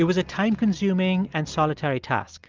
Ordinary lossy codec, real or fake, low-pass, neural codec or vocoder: Opus, 24 kbps; real; 7.2 kHz; none